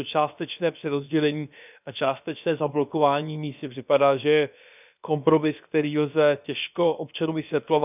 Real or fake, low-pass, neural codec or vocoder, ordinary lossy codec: fake; 3.6 kHz; codec, 16 kHz, about 1 kbps, DyCAST, with the encoder's durations; none